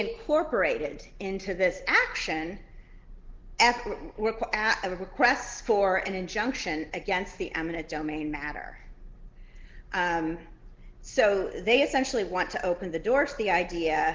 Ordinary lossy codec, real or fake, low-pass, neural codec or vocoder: Opus, 16 kbps; real; 7.2 kHz; none